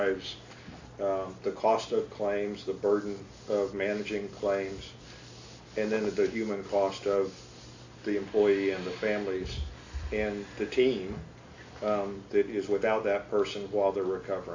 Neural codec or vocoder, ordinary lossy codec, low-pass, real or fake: none; AAC, 48 kbps; 7.2 kHz; real